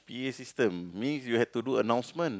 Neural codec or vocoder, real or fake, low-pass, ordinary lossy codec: none; real; none; none